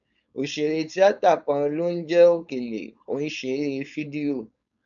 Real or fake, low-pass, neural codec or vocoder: fake; 7.2 kHz; codec, 16 kHz, 4.8 kbps, FACodec